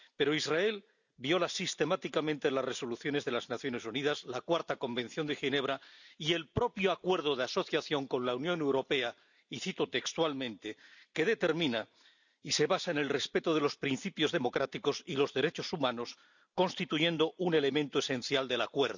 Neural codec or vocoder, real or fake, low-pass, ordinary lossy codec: none; real; 7.2 kHz; none